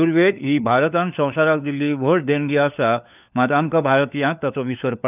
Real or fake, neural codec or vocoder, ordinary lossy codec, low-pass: fake; codec, 16 kHz, 4 kbps, FunCodec, trained on LibriTTS, 50 frames a second; none; 3.6 kHz